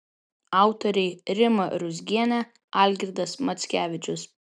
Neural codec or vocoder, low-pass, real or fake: none; 9.9 kHz; real